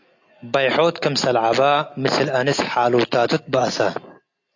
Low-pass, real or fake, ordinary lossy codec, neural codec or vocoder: 7.2 kHz; real; AAC, 48 kbps; none